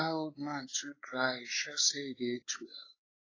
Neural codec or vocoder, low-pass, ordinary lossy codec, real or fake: codec, 16 kHz, 4 kbps, X-Codec, WavLM features, trained on Multilingual LibriSpeech; 7.2 kHz; AAC, 32 kbps; fake